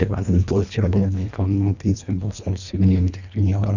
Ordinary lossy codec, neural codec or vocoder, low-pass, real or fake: none; codec, 24 kHz, 1.5 kbps, HILCodec; 7.2 kHz; fake